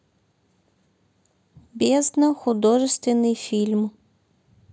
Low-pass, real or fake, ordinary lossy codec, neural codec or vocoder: none; real; none; none